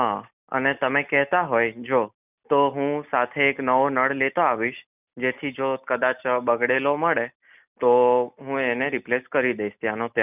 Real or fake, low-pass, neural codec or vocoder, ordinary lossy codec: real; 3.6 kHz; none; none